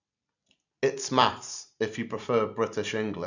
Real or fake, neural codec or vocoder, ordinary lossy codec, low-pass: fake; vocoder, 44.1 kHz, 128 mel bands every 256 samples, BigVGAN v2; none; 7.2 kHz